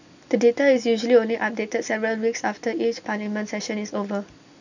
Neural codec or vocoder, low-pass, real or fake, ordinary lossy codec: none; 7.2 kHz; real; none